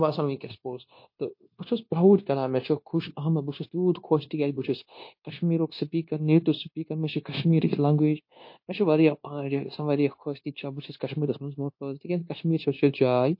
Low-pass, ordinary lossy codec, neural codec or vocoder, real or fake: 5.4 kHz; MP3, 32 kbps; codec, 16 kHz, 0.9 kbps, LongCat-Audio-Codec; fake